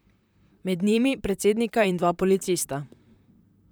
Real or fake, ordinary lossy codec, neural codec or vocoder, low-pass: fake; none; vocoder, 44.1 kHz, 128 mel bands, Pupu-Vocoder; none